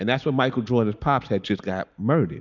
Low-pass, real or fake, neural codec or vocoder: 7.2 kHz; real; none